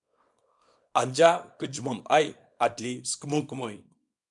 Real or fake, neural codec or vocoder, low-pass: fake; codec, 24 kHz, 0.9 kbps, WavTokenizer, small release; 10.8 kHz